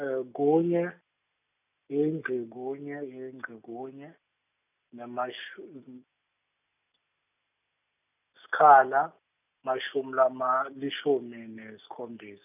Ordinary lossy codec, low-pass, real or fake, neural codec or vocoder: none; 3.6 kHz; real; none